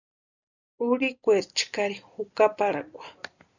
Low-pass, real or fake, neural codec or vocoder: 7.2 kHz; real; none